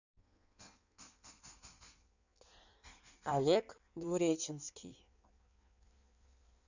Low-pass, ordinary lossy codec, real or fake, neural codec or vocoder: 7.2 kHz; none; fake; codec, 16 kHz in and 24 kHz out, 1.1 kbps, FireRedTTS-2 codec